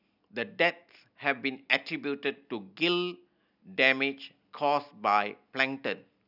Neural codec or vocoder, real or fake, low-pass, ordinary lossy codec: none; real; 5.4 kHz; none